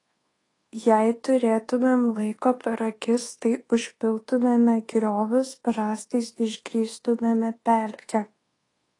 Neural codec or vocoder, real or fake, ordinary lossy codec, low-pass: codec, 24 kHz, 1.2 kbps, DualCodec; fake; AAC, 32 kbps; 10.8 kHz